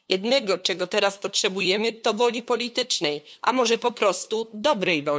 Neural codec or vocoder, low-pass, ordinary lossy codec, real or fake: codec, 16 kHz, 2 kbps, FunCodec, trained on LibriTTS, 25 frames a second; none; none; fake